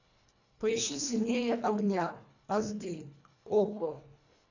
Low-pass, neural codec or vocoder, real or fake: 7.2 kHz; codec, 24 kHz, 1.5 kbps, HILCodec; fake